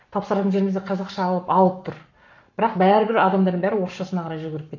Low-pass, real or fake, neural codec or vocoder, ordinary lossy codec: 7.2 kHz; fake; codec, 44.1 kHz, 7.8 kbps, Pupu-Codec; none